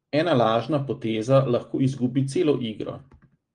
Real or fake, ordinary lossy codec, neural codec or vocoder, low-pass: real; Opus, 24 kbps; none; 9.9 kHz